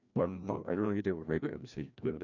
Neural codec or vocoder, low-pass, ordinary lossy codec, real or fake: codec, 16 kHz, 1 kbps, FreqCodec, larger model; 7.2 kHz; none; fake